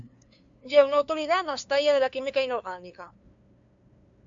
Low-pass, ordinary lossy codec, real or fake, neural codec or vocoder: 7.2 kHz; AAC, 64 kbps; fake; codec, 16 kHz, 2 kbps, FunCodec, trained on LibriTTS, 25 frames a second